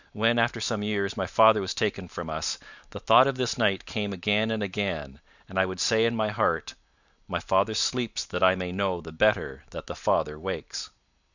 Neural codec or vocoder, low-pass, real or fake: vocoder, 44.1 kHz, 128 mel bands every 512 samples, BigVGAN v2; 7.2 kHz; fake